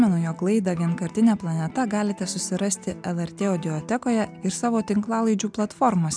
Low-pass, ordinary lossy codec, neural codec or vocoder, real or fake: 9.9 kHz; MP3, 96 kbps; none; real